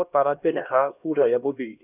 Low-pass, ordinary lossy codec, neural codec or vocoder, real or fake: 3.6 kHz; none; codec, 16 kHz, 1 kbps, X-Codec, HuBERT features, trained on LibriSpeech; fake